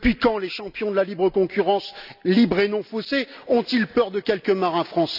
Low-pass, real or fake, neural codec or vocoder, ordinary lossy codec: 5.4 kHz; real; none; none